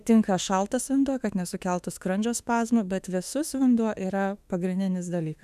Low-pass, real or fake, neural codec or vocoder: 14.4 kHz; fake; autoencoder, 48 kHz, 32 numbers a frame, DAC-VAE, trained on Japanese speech